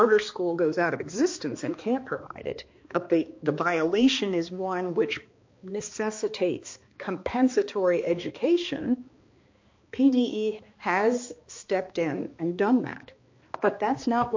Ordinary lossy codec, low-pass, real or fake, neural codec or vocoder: MP3, 48 kbps; 7.2 kHz; fake; codec, 16 kHz, 2 kbps, X-Codec, HuBERT features, trained on balanced general audio